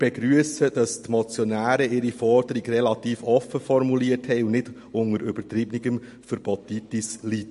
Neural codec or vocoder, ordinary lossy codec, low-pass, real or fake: none; MP3, 48 kbps; 14.4 kHz; real